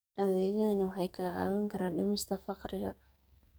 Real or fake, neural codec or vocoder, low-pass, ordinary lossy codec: fake; codec, 44.1 kHz, 2.6 kbps, SNAC; none; none